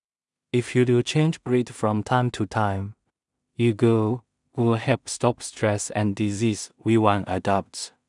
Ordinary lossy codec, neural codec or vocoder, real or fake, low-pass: none; codec, 16 kHz in and 24 kHz out, 0.4 kbps, LongCat-Audio-Codec, two codebook decoder; fake; 10.8 kHz